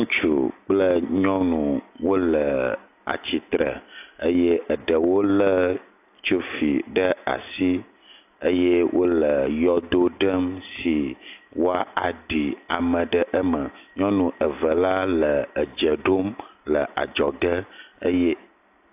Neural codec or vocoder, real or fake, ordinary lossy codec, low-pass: none; real; AAC, 32 kbps; 3.6 kHz